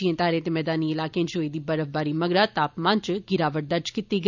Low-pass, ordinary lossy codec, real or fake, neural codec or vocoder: 7.2 kHz; none; fake; vocoder, 44.1 kHz, 128 mel bands every 256 samples, BigVGAN v2